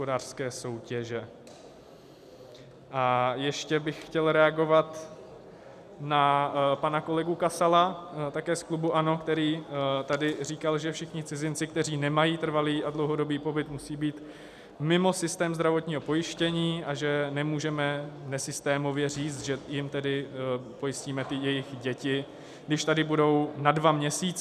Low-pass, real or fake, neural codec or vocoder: 14.4 kHz; real; none